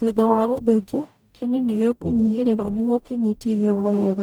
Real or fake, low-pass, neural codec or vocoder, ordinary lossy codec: fake; none; codec, 44.1 kHz, 0.9 kbps, DAC; none